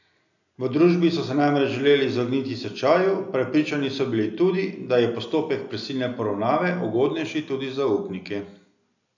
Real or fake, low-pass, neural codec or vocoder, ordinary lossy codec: real; 7.2 kHz; none; none